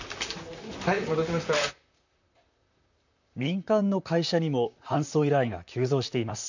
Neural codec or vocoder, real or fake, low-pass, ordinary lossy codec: vocoder, 44.1 kHz, 128 mel bands, Pupu-Vocoder; fake; 7.2 kHz; none